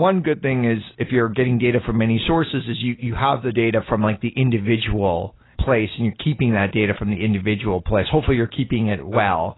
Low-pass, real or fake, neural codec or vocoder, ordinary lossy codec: 7.2 kHz; real; none; AAC, 16 kbps